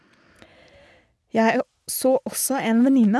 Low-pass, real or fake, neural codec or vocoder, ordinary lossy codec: none; real; none; none